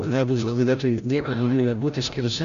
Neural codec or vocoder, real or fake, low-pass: codec, 16 kHz, 0.5 kbps, FreqCodec, larger model; fake; 7.2 kHz